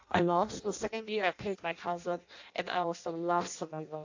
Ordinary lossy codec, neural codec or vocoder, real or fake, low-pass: AAC, 48 kbps; codec, 16 kHz in and 24 kHz out, 0.6 kbps, FireRedTTS-2 codec; fake; 7.2 kHz